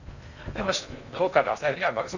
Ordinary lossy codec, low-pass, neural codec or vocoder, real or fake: none; 7.2 kHz; codec, 16 kHz in and 24 kHz out, 0.6 kbps, FocalCodec, streaming, 2048 codes; fake